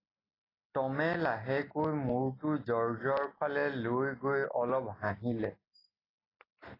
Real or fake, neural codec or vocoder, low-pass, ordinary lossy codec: real; none; 5.4 kHz; AAC, 24 kbps